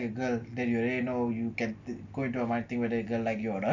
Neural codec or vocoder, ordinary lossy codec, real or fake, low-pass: none; none; real; 7.2 kHz